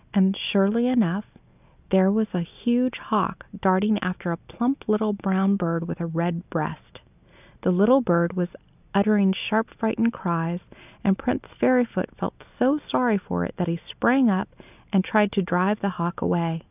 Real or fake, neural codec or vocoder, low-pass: real; none; 3.6 kHz